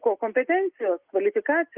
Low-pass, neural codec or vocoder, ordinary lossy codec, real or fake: 3.6 kHz; none; Opus, 32 kbps; real